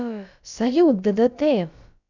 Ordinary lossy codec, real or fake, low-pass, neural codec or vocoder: none; fake; 7.2 kHz; codec, 16 kHz, about 1 kbps, DyCAST, with the encoder's durations